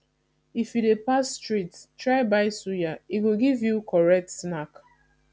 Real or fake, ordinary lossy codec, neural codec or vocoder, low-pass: real; none; none; none